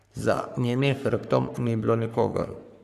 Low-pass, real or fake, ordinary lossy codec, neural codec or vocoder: 14.4 kHz; fake; none; codec, 44.1 kHz, 3.4 kbps, Pupu-Codec